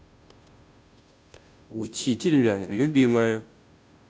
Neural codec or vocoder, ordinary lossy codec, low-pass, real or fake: codec, 16 kHz, 0.5 kbps, FunCodec, trained on Chinese and English, 25 frames a second; none; none; fake